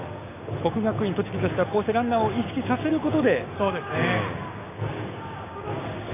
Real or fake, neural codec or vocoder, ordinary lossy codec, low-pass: fake; codec, 16 kHz, 6 kbps, DAC; none; 3.6 kHz